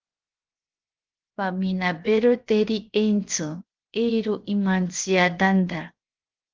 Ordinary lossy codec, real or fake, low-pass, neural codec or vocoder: Opus, 24 kbps; fake; 7.2 kHz; codec, 16 kHz, 0.7 kbps, FocalCodec